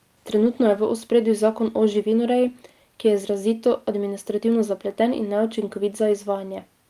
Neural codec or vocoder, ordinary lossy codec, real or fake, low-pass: none; Opus, 32 kbps; real; 14.4 kHz